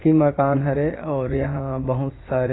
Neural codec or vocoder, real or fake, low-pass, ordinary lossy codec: vocoder, 44.1 kHz, 80 mel bands, Vocos; fake; 7.2 kHz; AAC, 16 kbps